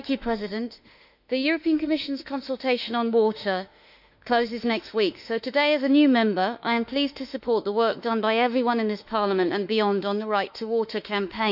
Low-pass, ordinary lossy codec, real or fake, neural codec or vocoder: 5.4 kHz; none; fake; autoencoder, 48 kHz, 32 numbers a frame, DAC-VAE, trained on Japanese speech